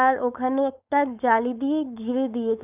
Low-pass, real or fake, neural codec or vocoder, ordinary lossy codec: 3.6 kHz; fake; codec, 16 kHz, 4.8 kbps, FACodec; none